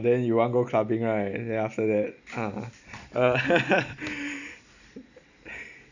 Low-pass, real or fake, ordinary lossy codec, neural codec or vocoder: 7.2 kHz; real; none; none